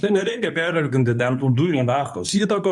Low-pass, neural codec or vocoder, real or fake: 10.8 kHz; codec, 24 kHz, 0.9 kbps, WavTokenizer, medium speech release version 2; fake